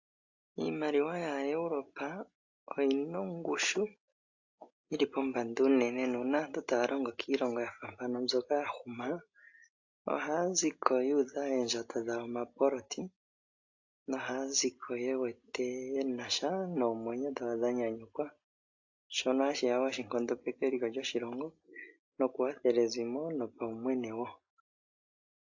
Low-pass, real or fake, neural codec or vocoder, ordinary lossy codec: 7.2 kHz; real; none; AAC, 48 kbps